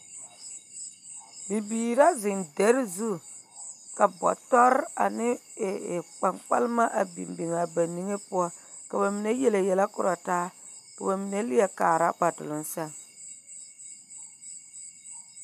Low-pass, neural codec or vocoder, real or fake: 14.4 kHz; none; real